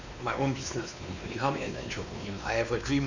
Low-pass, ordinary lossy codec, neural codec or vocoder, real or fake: 7.2 kHz; none; codec, 16 kHz, 2 kbps, X-Codec, WavLM features, trained on Multilingual LibriSpeech; fake